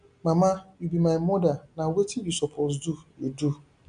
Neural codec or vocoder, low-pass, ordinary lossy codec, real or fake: none; 9.9 kHz; none; real